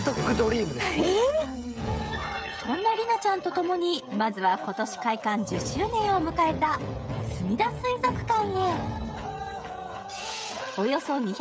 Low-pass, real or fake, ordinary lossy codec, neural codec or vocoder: none; fake; none; codec, 16 kHz, 16 kbps, FreqCodec, smaller model